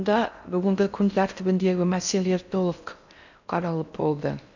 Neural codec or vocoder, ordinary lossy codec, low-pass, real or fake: codec, 16 kHz in and 24 kHz out, 0.6 kbps, FocalCodec, streaming, 4096 codes; none; 7.2 kHz; fake